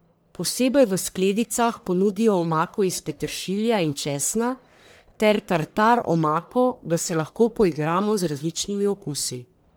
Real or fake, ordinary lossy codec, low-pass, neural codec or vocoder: fake; none; none; codec, 44.1 kHz, 1.7 kbps, Pupu-Codec